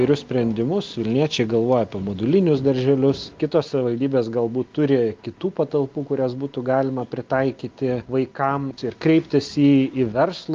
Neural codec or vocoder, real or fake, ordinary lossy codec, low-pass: none; real; Opus, 32 kbps; 7.2 kHz